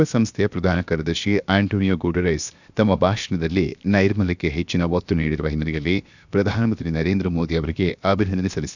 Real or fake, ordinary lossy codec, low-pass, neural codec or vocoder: fake; none; 7.2 kHz; codec, 16 kHz, 0.7 kbps, FocalCodec